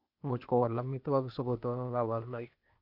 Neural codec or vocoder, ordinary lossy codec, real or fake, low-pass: codec, 16 kHz, 0.8 kbps, ZipCodec; none; fake; 5.4 kHz